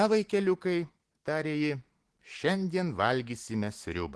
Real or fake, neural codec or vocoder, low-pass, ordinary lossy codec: real; none; 10.8 kHz; Opus, 16 kbps